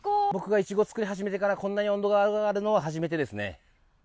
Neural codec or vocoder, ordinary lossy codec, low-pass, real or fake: none; none; none; real